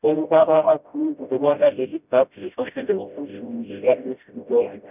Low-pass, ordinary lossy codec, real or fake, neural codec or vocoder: 3.6 kHz; none; fake; codec, 16 kHz, 0.5 kbps, FreqCodec, smaller model